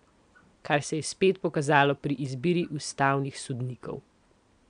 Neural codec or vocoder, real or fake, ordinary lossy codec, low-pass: none; real; none; 9.9 kHz